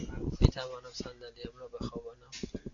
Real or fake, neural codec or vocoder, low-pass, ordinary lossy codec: real; none; 7.2 kHz; AAC, 64 kbps